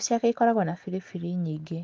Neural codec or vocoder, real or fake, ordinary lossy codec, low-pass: none; real; Opus, 32 kbps; 7.2 kHz